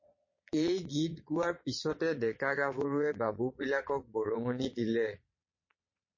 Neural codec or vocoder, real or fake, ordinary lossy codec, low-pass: vocoder, 22.05 kHz, 80 mel bands, Vocos; fake; MP3, 32 kbps; 7.2 kHz